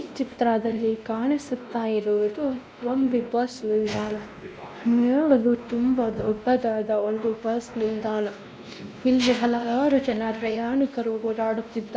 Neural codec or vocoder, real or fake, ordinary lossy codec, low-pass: codec, 16 kHz, 1 kbps, X-Codec, WavLM features, trained on Multilingual LibriSpeech; fake; none; none